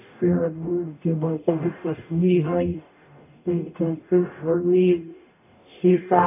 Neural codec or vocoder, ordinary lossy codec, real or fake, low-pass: codec, 44.1 kHz, 0.9 kbps, DAC; AAC, 32 kbps; fake; 3.6 kHz